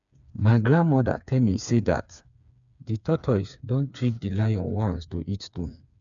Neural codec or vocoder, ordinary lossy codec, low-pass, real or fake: codec, 16 kHz, 4 kbps, FreqCodec, smaller model; none; 7.2 kHz; fake